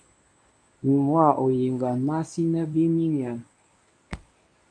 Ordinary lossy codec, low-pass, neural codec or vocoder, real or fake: AAC, 64 kbps; 9.9 kHz; codec, 24 kHz, 0.9 kbps, WavTokenizer, medium speech release version 1; fake